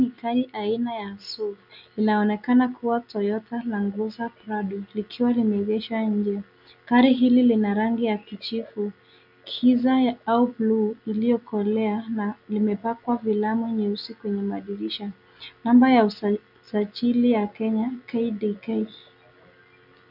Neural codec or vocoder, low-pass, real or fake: none; 5.4 kHz; real